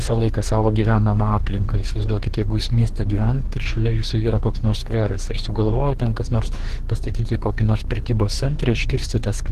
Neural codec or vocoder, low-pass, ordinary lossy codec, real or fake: codec, 44.1 kHz, 2.6 kbps, DAC; 14.4 kHz; Opus, 16 kbps; fake